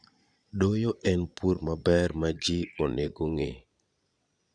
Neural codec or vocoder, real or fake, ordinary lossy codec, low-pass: vocoder, 22.05 kHz, 80 mel bands, Vocos; fake; none; 9.9 kHz